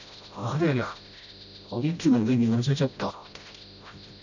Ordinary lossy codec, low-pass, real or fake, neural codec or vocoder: none; 7.2 kHz; fake; codec, 16 kHz, 0.5 kbps, FreqCodec, smaller model